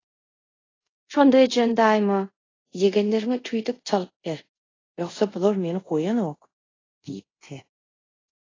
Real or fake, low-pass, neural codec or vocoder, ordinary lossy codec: fake; 7.2 kHz; codec, 24 kHz, 0.5 kbps, DualCodec; AAC, 32 kbps